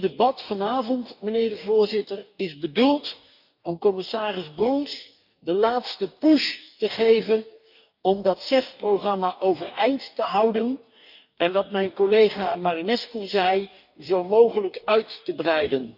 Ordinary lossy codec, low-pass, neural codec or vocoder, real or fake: none; 5.4 kHz; codec, 44.1 kHz, 2.6 kbps, DAC; fake